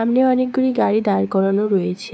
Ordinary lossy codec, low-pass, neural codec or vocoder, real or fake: none; none; codec, 16 kHz, 6 kbps, DAC; fake